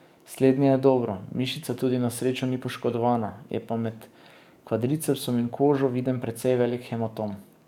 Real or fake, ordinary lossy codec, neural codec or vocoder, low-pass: fake; none; codec, 44.1 kHz, 7.8 kbps, DAC; 19.8 kHz